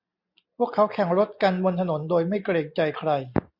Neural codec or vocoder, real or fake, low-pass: none; real; 5.4 kHz